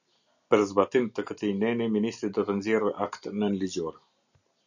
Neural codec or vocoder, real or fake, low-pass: none; real; 7.2 kHz